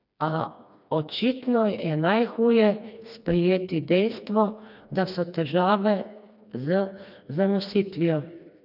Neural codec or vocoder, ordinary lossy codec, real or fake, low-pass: codec, 16 kHz, 2 kbps, FreqCodec, smaller model; none; fake; 5.4 kHz